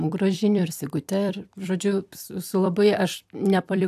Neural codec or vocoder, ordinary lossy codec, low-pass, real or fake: vocoder, 44.1 kHz, 128 mel bands every 256 samples, BigVGAN v2; AAC, 96 kbps; 14.4 kHz; fake